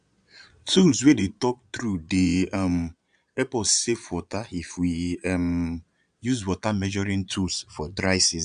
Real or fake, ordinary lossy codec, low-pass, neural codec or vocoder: fake; AAC, 96 kbps; 9.9 kHz; vocoder, 22.05 kHz, 80 mel bands, Vocos